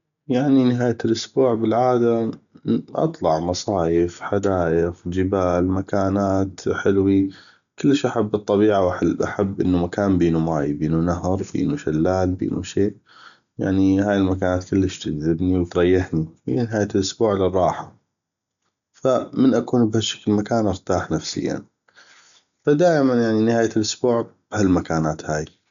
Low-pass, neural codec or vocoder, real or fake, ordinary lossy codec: 7.2 kHz; none; real; none